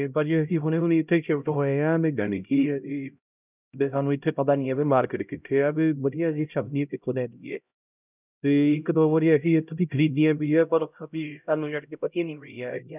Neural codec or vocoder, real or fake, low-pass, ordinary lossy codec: codec, 16 kHz, 0.5 kbps, X-Codec, HuBERT features, trained on LibriSpeech; fake; 3.6 kHz; none